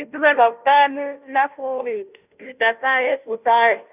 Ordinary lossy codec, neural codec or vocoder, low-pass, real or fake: none; codec, 16 kHz, 0.5 kbps, FunCodec, trained on Chinese and English, 25 frames a second; 3.6 kHz; fake